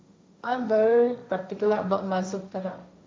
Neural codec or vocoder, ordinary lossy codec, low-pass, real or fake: codec, 16 kHz, 1.1 kbps, Voila-Tokenizer; none; none; fake